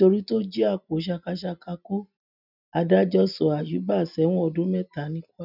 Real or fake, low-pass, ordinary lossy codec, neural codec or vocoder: real; 5.4 kHz; none; none